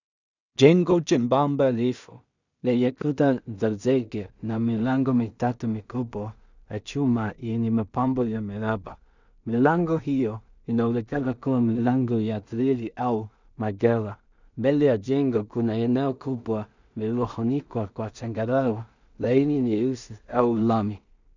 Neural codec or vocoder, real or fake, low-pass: codec, 16 kHz in and 24 kHz out, 0.4 kbps, LongCat-Audio-Codec, two codebook decoder; fake; 7.2 kHz